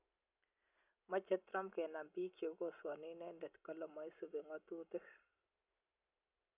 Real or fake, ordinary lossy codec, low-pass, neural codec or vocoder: real; none; 3.6 kHz; none